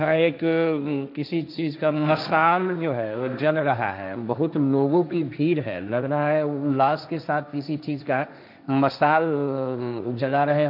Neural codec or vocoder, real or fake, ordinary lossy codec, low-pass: codec, 16 kHz, 1.1 kbps, Voila-Tokenizer; fake; none; 5.4 kHz